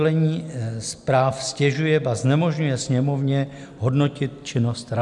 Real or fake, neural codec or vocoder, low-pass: real; none; 10.8 kHz